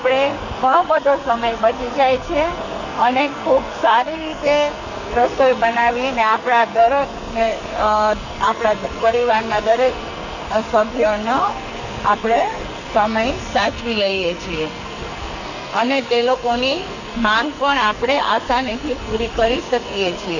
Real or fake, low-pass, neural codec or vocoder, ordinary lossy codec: fake; 7.2 kHz; codec, 44.1 kHz, 2.6 kbps, SNAC; none